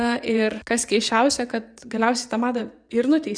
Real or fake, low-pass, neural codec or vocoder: fake; 9.9 kHz; vocoder, 22.05 kHz, 80 mel bands, WaveNeXt